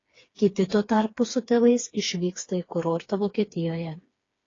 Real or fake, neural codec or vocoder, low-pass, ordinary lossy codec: fake; codec, 16 kHz, 4 kbps, FreqCodec, smaller model; 7.2 kHz; AAC, 32 kbps